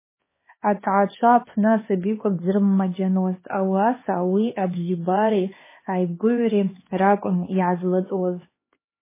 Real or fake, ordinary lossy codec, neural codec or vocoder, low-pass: fake; MP3, 16 kbps; codec, 16 kHz, 2 kbps, X-Codec, HuBERT features, trained on LibriSpeech; 3.6 kHz